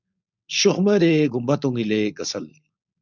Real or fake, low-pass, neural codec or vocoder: fake; 7.2 kHz; codec, 16 kHz, 4.8 kbps, FACodec